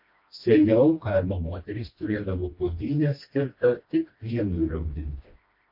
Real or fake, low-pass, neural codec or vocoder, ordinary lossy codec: fake; 5.4 kHz; codec, 16 kHz, 1 kbps, FreqCodec, smaller model; MP3, 32 kbps